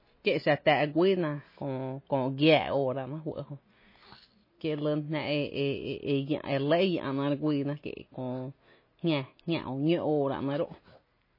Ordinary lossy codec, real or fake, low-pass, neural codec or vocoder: MP3, 24 kbps; real; 5.4 kHz; none